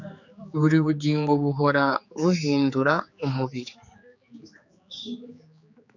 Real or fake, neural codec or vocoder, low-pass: fake; codec, 16 kHz, 4 kbps, X-Codec, HuBERT features, trained on general audio; 7.2 kHz